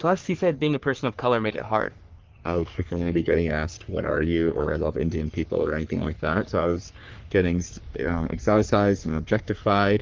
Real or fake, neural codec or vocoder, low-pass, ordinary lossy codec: fake; codec, 44.1 kHz, 3.4 kbps, Pupu-Codec; 7.2 kHz; Opus, 32 kbps